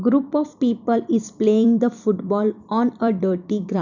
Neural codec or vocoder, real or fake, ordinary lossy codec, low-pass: vocoder, 44.1 kHz, 128 mel bands every 256 samples, BigVGAN v2; fake; none; 7.2 kHz